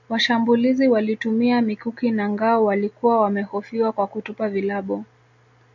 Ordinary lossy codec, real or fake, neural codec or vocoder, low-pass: MP3, 64 kbps; real; none; 7.2 kHz